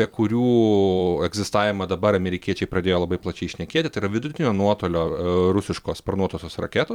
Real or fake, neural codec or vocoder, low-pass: fake; vocoder, 44.1 kHz, 128 mel bands every 256 samples, BigVGAN v2; 19.8 kHz